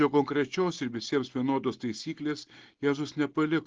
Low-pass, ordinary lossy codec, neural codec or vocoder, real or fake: 7.2 kHz; Opus, 16 kbps; none; real